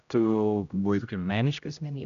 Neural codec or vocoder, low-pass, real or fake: codec, 16 kHz, 0.5 kbps, X-Codec, HuBERT features, trained on general audio; 7.2 kHz; fake